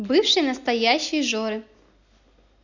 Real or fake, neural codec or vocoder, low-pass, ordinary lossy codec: real; none; 7.2 kHz; none